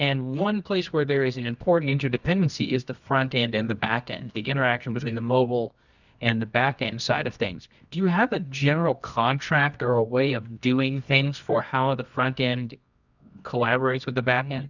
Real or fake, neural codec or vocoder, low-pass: fake; codec, 24 kHz, 0.9 kbps, WavTokenizer, medium music audio release; 7.2 kHz